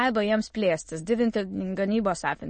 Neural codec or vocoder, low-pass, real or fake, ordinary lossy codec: autoencoder, 22.05 kHz, a latent of 192 numbers a frame, VITS, trained on many speakers; 9.9 kHz; fake; MP3, 32 kbps